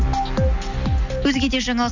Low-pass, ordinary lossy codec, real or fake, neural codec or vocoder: 7.2 kHz; none; real; none